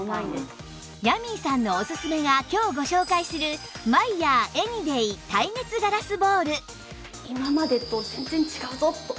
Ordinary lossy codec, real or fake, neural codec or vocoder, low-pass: none; real; none; none